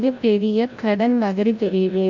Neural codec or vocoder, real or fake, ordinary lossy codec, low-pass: codec, 16 kHz, 0.5 kbps, FreqCodec, larger model; fake; MP3, 64 kbps; 7.2 kHz